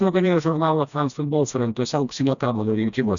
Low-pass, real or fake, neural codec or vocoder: 7.2 kHz; fake; codec, 16 kHz, 1 kbps, FreqCodec, smaller model